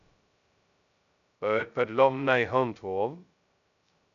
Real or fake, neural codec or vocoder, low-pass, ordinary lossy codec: fake; codec, 16 kHz, 0.2 kbps, FocalCodec; 7.2 kHz; none